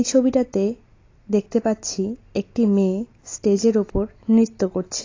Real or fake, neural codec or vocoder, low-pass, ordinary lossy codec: real; none; 7.2 kHz; AAC, 32 kbps